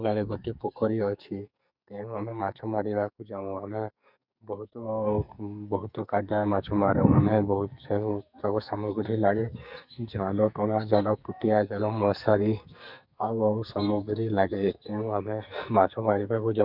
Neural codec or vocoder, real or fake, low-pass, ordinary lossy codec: codec, 44.1 kHz, 2.6 kbps, SNAC; fake; 5.4 kHz; none